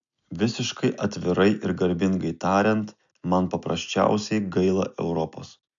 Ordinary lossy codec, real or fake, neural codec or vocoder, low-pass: MP3, 64 kbps; real; none; 7.2 kHz